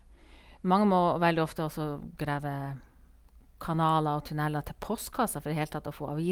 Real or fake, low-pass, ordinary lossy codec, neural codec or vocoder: real; 19.8 kHz; Opus, 32 kbps; none